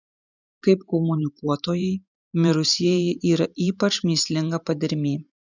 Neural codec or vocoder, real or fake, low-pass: vocoder, 44.1 kHz, 128 mel bands every 512 samples, BigVGAN v2; fake; 7.2 kHz